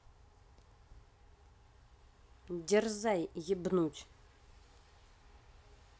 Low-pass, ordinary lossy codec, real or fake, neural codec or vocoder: none; none; real; none